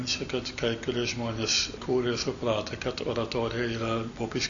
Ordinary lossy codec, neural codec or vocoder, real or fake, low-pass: Opus, 64 kbps; none; real; 7.2 kHz